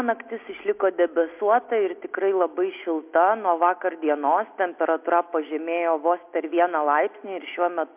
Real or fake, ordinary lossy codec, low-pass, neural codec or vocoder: real; MP3, 32 kbps; 3.6 kHz; none